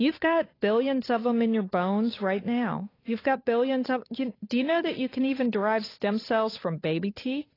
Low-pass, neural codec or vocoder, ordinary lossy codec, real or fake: 5.4 kHz; codec, 16 kHz, 8 kbps, FunCodec, trained on Chinese and English, 25 frames a second; AAC, 24 kbps; fake